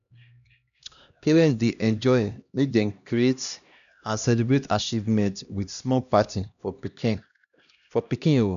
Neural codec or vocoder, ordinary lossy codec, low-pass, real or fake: codec, 16 kHz, 1 kbps, X-Codec, HuBERT features, trained on LibriSpeech; none; 7.2 kHz; fake